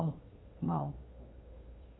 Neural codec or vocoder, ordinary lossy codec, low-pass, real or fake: codec, 16 kHz, 2 kbps, FunCodec, trained on Chinese and English, 25 frames a second; AAC, 16 kbps; 7.2 kHz; fake